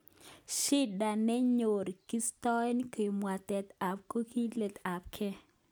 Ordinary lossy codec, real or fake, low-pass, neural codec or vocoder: none; real; none; none